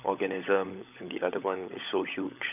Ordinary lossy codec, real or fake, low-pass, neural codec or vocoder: none; fake; 3.6 kHz; codec, 16 kHz, 16 kbps, FunCodec, trained on LibriTTS, 50 frames a second